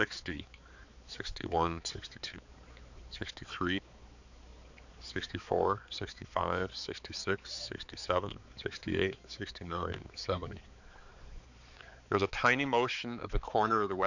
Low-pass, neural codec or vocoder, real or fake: 7.2 kHz; codec, 16 kHz, 4 kbps, X-Codec, HuBERT features, trained on balanced general audio; fake